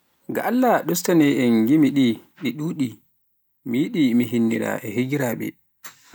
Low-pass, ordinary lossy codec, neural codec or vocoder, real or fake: none; none; none; real